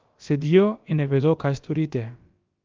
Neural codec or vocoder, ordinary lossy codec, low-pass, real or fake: codec, 16 kHz, about 1 kbps, DyCAST, with the encoder's durations; Opus, 32 kbps; 7.2 kHz; fake